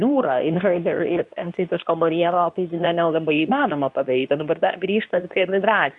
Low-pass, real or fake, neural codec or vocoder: 10.8 kHz; fake; codec, 24 kHz, 0.9 kbps, WavTokenizer, medium speech release version 2